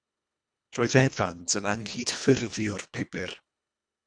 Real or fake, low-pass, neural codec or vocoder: fake; 9.9 kHz; codec, 24 kHz, 1.5 kbps, HILCodec